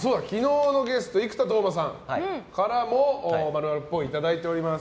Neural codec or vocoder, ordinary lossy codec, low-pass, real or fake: none; none; none; real